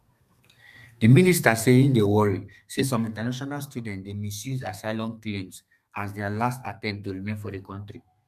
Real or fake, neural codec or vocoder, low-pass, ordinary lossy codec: fake; codec, 32 kHz, 1.9 kbps, SNAC; 14.4 kHz; none